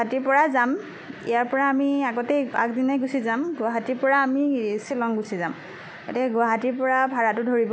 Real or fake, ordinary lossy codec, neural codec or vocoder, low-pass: real; none; none; none